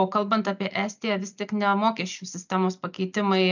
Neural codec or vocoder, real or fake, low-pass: none; real; 7.2 kHz